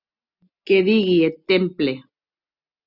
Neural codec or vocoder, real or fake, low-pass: none; real; 5.4 kHz